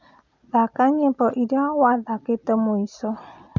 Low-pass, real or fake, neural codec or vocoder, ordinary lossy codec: 7.2 kHz; real; none; none